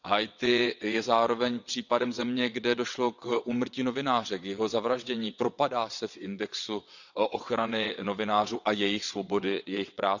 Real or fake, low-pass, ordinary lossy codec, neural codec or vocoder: fake; 7.2 kHz; none; vocoder, 22.05 kHz, 80 mel bands, WaveNeXt